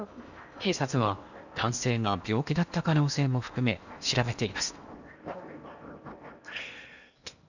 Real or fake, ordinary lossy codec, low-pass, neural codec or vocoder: fake; none; 7.2 kHz; codec, 16 kHz in and 24 kHz out, 0.8 kbps, FocalCodec, streaming, 65536 codes